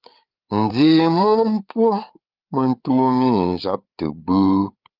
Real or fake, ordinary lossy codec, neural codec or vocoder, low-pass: fake; Opus, 24 kbps; codec, 16 kHz, 8 kbps, FreqCodec, larger model; 5.4 kHz